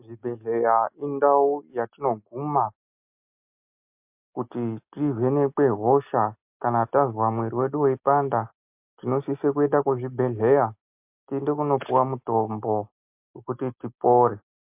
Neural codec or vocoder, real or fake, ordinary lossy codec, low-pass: none; real; MP3, 32 kbps; 3.6 kHz